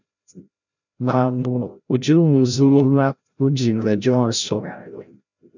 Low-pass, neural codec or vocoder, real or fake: 7.2 kHz; codec, 16 kHz, 0.5 kbps, FreqCodec, larger model; fake